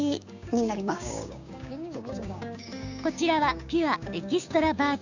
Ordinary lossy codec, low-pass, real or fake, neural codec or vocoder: none; 7.2 kHz; fake; codec, 16 kHz, 6 kbps, DAC